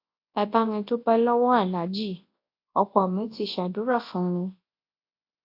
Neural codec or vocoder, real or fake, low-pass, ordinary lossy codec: codec, 24 kHz, 0.9 kbps, WavTokenizer, large speech release; fake; 5.4 kHz; AAC, 32 kbps